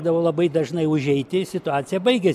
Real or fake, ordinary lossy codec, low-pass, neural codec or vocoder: real; AAC, 96 kbps; 14.4 kHz; none